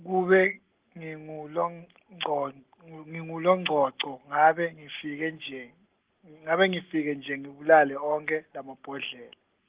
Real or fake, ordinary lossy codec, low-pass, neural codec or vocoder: real; Opus, 16 kbps; 3.6 kHz; none